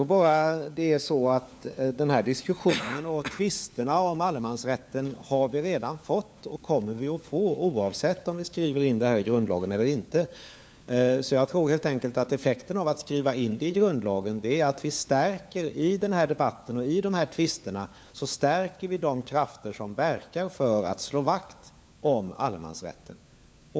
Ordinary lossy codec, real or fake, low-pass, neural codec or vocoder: none; fake; none; codec, 16 kHz, 4 kbps, FunCodec, trained on LibriTTS, 50 frames a second